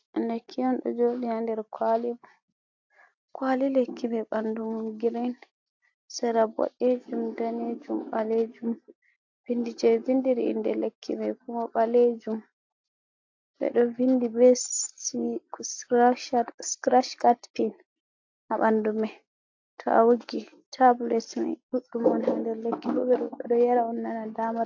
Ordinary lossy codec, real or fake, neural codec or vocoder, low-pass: AAC, 48 kbps; real; none; 7.2 kHz